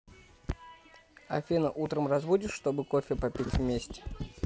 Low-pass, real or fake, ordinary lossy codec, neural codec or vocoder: none; real; none; none